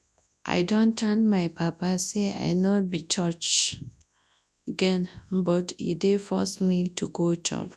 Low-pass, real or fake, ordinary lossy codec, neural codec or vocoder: none; fake; none; codec, 24 kHz, 0.9 kbps, WavTokenizer, large speech release